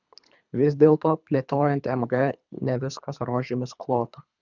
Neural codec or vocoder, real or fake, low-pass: codec, 24 kHz, 3 kbps, HILCodec; fake; 7.2 kHz